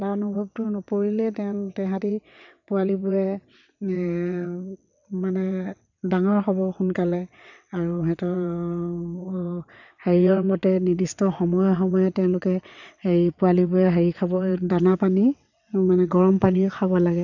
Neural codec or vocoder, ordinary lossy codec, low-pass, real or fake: vocoder, 22.05 kHz, 80 mel bands, WaveNeXt; none; 7.2 kHz; fake